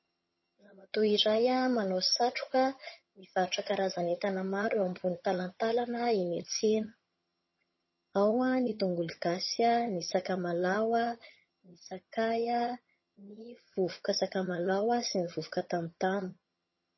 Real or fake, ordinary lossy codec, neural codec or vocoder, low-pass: fake; MP3, 24 kbps; vocoder, 22.05 kHz, 80 mel bands, HiFi-GAN; 7.2 kHz